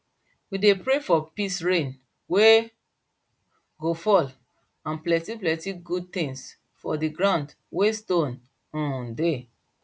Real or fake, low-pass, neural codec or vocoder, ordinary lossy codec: real; none; none; none